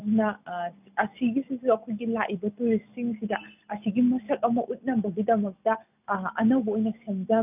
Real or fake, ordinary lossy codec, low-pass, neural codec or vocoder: real; none; 3.6 kHz; none